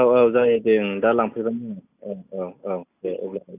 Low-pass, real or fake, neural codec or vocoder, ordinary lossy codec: 3.6 kHz; real; none; none